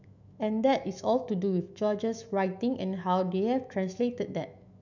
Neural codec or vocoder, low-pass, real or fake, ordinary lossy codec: autoencoder, 48 kHz, 128 numbers a frame, DAC-VAE, trained on Japanese speech; 7.2 kHz; fake; none